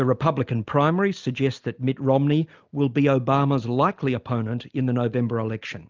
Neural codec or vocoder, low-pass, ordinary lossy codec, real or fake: none; 7.2 kHz; Opus, 24 kbps; real